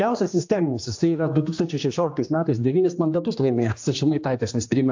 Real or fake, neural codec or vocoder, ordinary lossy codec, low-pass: fake; codec, 16 kHz, 2 kbps, X-Codec, HuBERT features, trained on general audio; AAC, 48 kbps; 7.2 kHz